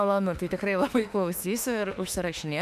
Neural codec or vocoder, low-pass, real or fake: autoencoder, 48 kHz, 32 numbers a frame, DAC-VAE, trained on Japanese speech; 14.4 kHz; fake